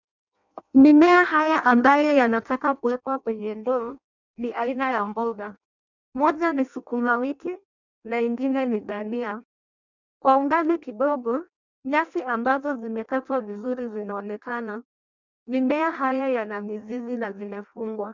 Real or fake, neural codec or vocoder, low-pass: fake; codec, 16 kHz in and 24 kHz out, 0.6 kbps, FireRedTTS-2 codec; 7.2 kHz